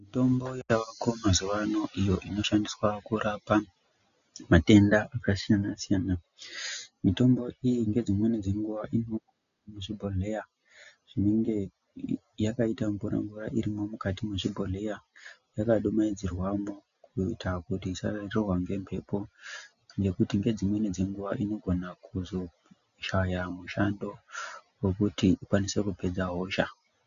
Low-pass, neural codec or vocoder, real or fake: 7.2 kHz; none; real